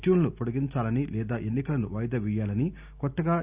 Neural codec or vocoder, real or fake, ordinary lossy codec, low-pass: none; real; Opus, 64 kbps; 3.6 kHz